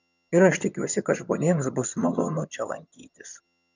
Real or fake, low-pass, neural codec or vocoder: fake; 7.2 kHz; vocoder, 22.05 kHz, 80 mel bands, HiFi-GAN